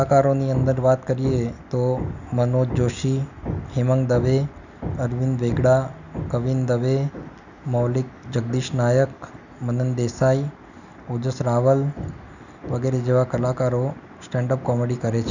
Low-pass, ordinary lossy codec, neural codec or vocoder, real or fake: 7.2 kHz; none; none; real